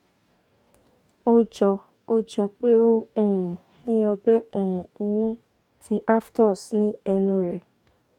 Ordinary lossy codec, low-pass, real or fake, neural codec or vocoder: MP3, 96 kbps; 19.8 kHz; fake; codec, 44.1 kHz, 2.6 kbps, DAC